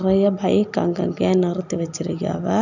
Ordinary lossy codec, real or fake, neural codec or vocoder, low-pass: none; real; none; 7.2 kHz